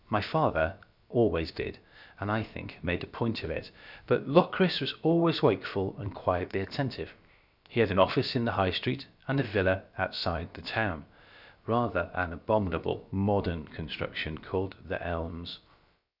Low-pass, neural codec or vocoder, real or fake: 5.4 kHz; codec, 16 kHz, about 1 kbps, DyCAST, with the encoder's durations; fake